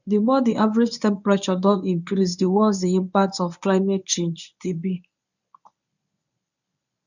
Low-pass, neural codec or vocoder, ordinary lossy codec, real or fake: 7.2 kHz; codec, 24 kHz, 0.9 kbps, WavTokenizer, medium speech release version 1; none; fake